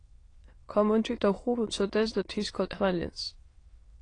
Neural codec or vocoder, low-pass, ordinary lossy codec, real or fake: autoencoder, 22.05 kHz, a latent of 192 numbers a frame, VITS, trained on many speakers; 9.9 kHz; AAC, 32 kbps; fake